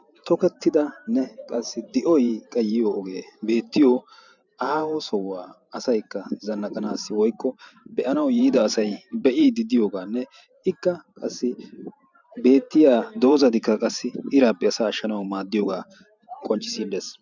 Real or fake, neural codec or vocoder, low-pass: fake; vocoder, 44.1 kHz, 128 mel bands every 512 samples, BigVGAN v2; 7.2 kHz